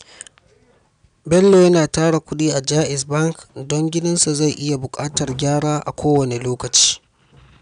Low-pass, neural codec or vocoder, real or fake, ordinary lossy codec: 9.9 kHz; none; real; none